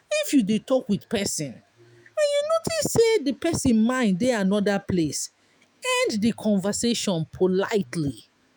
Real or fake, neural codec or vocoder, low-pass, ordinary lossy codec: fake; autoencoder, 48 kHz, 128 numbers a frame, DAC-VAE, trained on Japanese speech; none; none